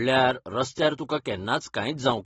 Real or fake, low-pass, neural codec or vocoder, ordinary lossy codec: real; 19.8 kHz; none; AAC, 24 kbps